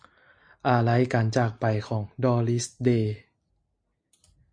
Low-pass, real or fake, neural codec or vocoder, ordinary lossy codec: 9.9 kHz; real; none; MP3, 48 kbps